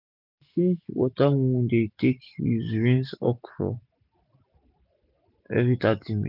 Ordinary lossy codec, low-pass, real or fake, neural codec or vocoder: none; 5.4 kHz; real; none